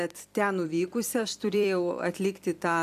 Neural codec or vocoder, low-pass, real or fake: vocoder, 44.1 kHz, 128 mel bands every 256 samples, BigVGAN v2; 14.4 kHz; fake